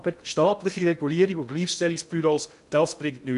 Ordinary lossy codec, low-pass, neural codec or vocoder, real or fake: none; 10.8 kHz; codec, 16 kHz in and 24 kHz out, 0.6 kbps, FocalCodec, streaming, 2048 codes; fake